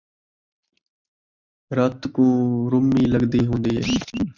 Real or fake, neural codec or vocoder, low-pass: real; none; 7.2 kHz